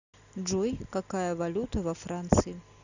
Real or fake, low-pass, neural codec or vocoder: real; 7.2 kHz; none